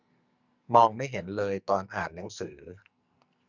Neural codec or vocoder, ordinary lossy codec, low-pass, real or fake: codec, 44.1 kHz, 2.6 kbps, SNAC; none; 7.2 kHz; fake